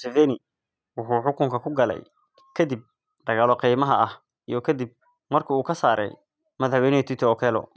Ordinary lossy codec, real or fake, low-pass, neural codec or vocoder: none; real; none; none